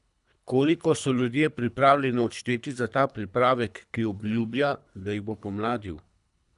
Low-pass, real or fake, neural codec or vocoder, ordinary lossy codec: 10.8 kHz; fake; codec, 24 kHz, 3 kbps, HILCodec; none